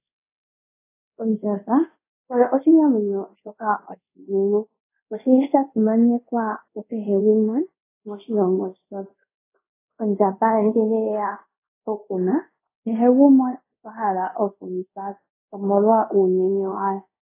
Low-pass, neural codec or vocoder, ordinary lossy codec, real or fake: 3.6 kHz; codec, 24 kHz, 0.5 kbps, DualCodec; AAC, 24 kbps; fake